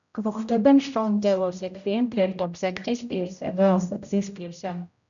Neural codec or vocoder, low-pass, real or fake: codec, 16 kHz, 0.5 kbps, X-Codec, HuBERT features, trained on general audio; 7.2 kHz; fake